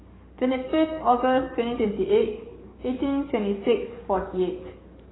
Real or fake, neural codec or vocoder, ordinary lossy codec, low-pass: fake; codec, 44.1 kHz, 7.8 kbps, DAC; AAC, 16 kbps; 7.2 kHz